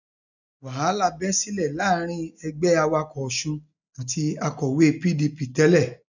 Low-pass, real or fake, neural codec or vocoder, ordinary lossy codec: 7.2 kHz; real; none; none